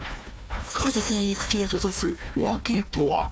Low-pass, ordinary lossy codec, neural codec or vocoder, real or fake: none; none; codec, 16 kHz, 1 kbps, FunCodec, trained on Chinese and English, 50 frames a second; fake